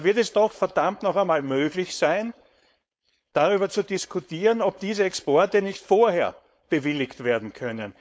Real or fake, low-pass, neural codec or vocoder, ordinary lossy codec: fake; none; codec, 16 kHz, 4.8 kbps, FACodec; none